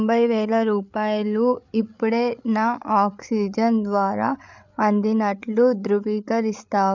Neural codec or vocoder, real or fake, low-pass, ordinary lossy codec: codec, 16 kHz, 8 kbps, FreqCodec, larger model; fake; 7.2 kHz; none